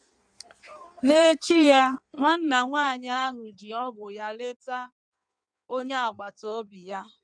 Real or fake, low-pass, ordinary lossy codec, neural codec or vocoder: fake; 9.9 kHz; none; codec, 16 kHz in and 24 kHz out, 1.1 kbps, FireRedTTS-2 codec